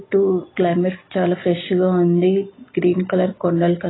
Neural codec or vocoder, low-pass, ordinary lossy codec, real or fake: none; 7.2 kHz; AAC, 16 kbps; real